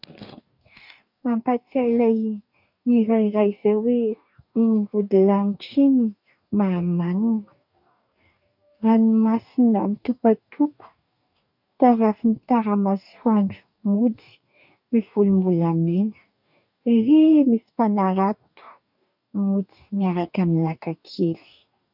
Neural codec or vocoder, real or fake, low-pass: codec, 44.1 kHz, 2.6 kbps, DAC; fake; 5.4 kHz